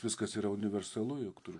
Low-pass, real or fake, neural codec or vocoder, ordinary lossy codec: 10.8 kHz; real; none; AAC, 48 kbps